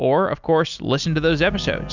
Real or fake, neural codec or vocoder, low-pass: real; none; 7.2 kHz